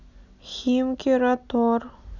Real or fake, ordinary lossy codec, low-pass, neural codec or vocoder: real; none; 7.2 kHz; none